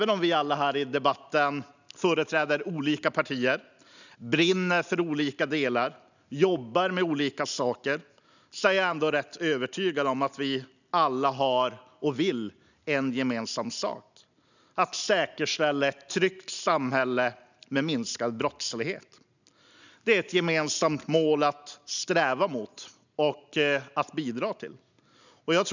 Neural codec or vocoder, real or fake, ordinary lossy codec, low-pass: none; real; none; 7.2 kHz